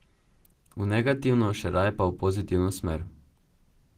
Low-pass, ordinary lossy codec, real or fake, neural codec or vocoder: 14.4 kHz; Opus, 16 kbps; real; none